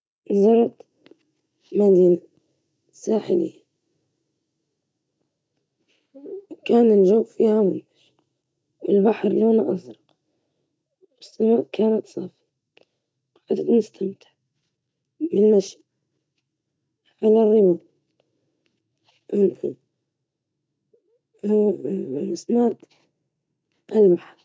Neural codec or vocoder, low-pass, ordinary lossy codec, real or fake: none; none; none; real